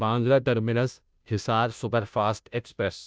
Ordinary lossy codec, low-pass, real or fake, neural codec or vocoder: none; none; fake; codec, 16 kHz, 0.5 kbps, FunCodec, trained on Chinese and English, 25 frames a second